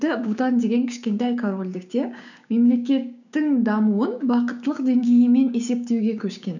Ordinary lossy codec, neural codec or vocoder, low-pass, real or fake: none; codec, 16 kHz, 6 kbps, DAC; 7.2 kHz; fake